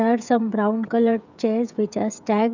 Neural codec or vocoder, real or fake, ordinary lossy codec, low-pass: codec, 16 kHz, 16 kbps, FreqCodec, smaller model; fake; none; 7.2 kHz